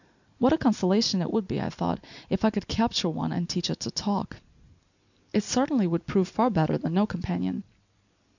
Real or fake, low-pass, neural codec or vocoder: real; 7.2 kHz; none